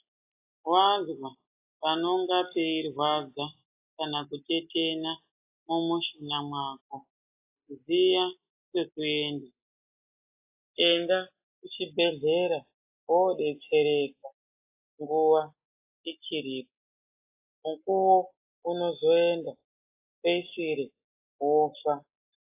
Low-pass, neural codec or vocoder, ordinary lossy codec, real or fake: 3.6 kHz; none; AAC, 24 kbps; real